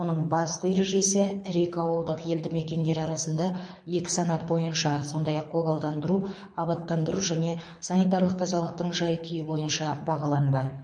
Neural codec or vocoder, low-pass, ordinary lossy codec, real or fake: codec, 24 kHz, 3 kbps, HILCodec; 9.9 kHz; MP3, 48 kbps; fake